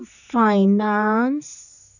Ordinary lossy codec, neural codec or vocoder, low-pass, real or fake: none; codec, 16 kHz, 4 kbps, X-Codec, HuBERT features, trained on general audio; 7.2 kHz; fake